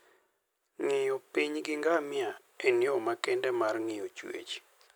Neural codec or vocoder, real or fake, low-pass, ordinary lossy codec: none; real; none; none